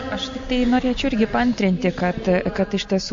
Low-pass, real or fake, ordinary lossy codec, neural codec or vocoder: 7.2 kHz; real; MP3, 48 kbps; none